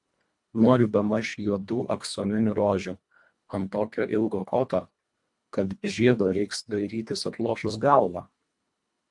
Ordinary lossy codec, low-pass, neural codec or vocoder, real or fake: MP3, 64 kbps; 10.8 kHz; codec, 24 kHz, 1.5 kbps, HILCodec; fake